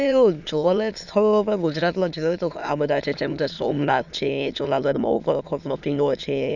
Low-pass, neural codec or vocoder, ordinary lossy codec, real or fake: 7.2 kHz; autoencoder, 22.05 kHz, a latent of 192 numbers a frame, VITS, trained on many speakers; none; fake